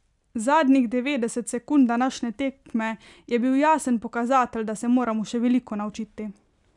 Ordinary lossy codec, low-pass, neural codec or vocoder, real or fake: none; 10.8 kHz; none; real